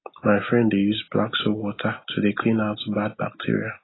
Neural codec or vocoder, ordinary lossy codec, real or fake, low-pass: none; AAC, 16 kbps; real; 7.2 kHz